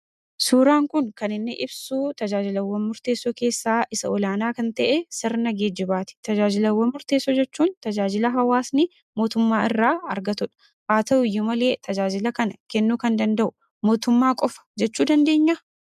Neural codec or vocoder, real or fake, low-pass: none; real; 14.4 kHz